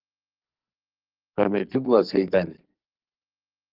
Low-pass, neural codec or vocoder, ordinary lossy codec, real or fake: 5.4 kHz; codec, 44.1 kHz, 2.6 kbps, SNAC; Opus, 32 kbps; fake